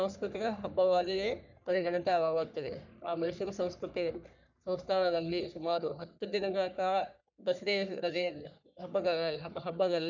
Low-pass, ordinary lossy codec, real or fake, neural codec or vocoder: 7.2 kHz; none; fake; codec, 44.1 kHz, 3.4 kbps, Pupu-Codec